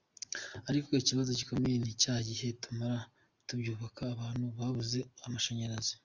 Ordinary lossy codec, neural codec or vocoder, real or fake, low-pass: Opus, 64 kbps; none; real; 7.2 kHz